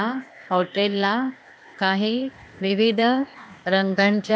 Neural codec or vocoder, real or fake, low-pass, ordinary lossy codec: codec, 16 kHz, 0.8 kbps, ZipCodec; fake; none; none